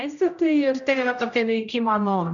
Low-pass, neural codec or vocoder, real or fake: 7.2 kHz; codec, 16 kHz, 0.5 kbps, X-Codec, HuBERT features, trained on general audio; fake